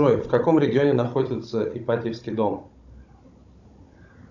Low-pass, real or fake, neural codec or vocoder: 7.2 kHz; fake; codec, 16 kHz, 16 kbps, FunCodec, trained on Chinese and English, 50 frames a second